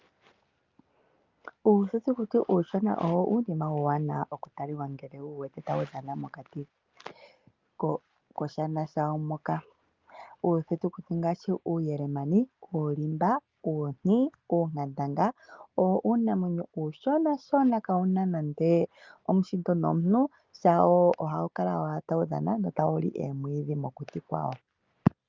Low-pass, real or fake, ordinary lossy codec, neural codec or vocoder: 7.2 kHz; real; Opus, 24 kbps; none